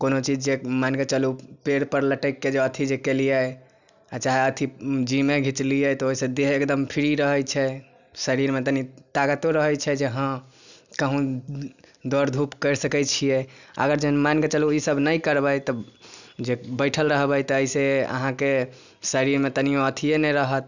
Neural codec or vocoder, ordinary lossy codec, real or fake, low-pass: none; none; real; 7.2 kHz